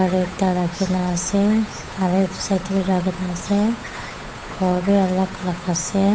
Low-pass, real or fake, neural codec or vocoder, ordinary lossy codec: none; fake; codec, 16 kHz, 8 kbps, FunCodec, trained on Chinese and English, 25 frames a second; none